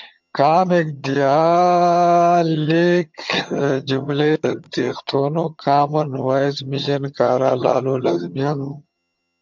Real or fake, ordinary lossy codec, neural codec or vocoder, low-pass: fake; MP3, 64 kbps; vocoder, 22.05 kHz, 80 mel bands, HiFi-GAN; 7.2 kHz